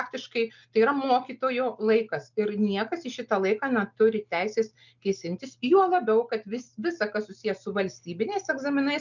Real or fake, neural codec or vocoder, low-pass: real; none; 7.2 kHz